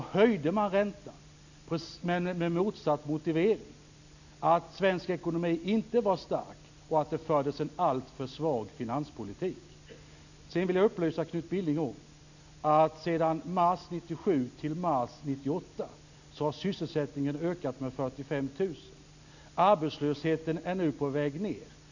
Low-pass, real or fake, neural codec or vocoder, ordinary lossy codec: 7.2 kHz; real; none; none